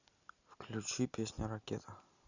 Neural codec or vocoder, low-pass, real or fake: none; 7.2 kHz; real